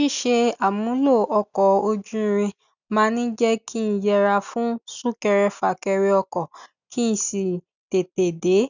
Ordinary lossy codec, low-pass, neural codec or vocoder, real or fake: none; 7.2 kHz; none; real